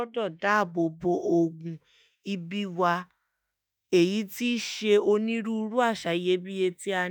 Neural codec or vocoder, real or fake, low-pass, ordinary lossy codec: autoencoder, 48 kHz, 32 numbers a frame, DAC-VAE, trained on Japanese speech; fake; none; none